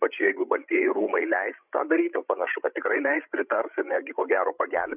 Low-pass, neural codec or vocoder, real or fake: 3.6 kHz; codec, 16 kHz, 16 kbps, FreqCodec, larger model; fake